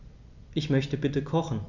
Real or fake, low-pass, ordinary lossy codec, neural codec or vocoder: real; 7.2 kHz; none; none